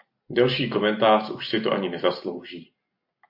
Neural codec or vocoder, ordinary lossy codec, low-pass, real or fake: none; MP3, 32 kbps; 5.4 kHz; real